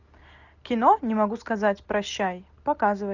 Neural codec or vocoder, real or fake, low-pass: none; real; 7.2 kHz